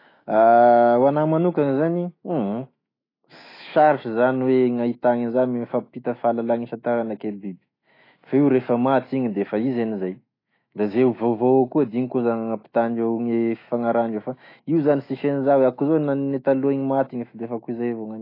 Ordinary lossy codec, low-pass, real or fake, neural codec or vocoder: AAC, 24 kbps; 5.4 kHz; fake; autoencoder, 48 kHz, 128 numbers a frame, DAC-VAE, trained on Japanese speech